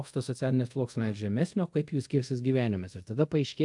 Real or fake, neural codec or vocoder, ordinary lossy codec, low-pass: fake; codec, 24 kHz, 0.5 kbps, DualCodec; AAC, 64 kbps; 10.8 kHz